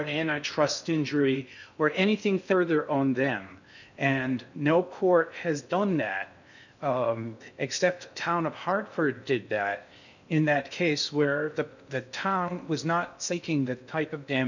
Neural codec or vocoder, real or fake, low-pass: codec, 16 kHz in and 24 kHz out, 0.6 kbps, FocalCodec, streaming, 4096 codes; fake; 7.2 kHz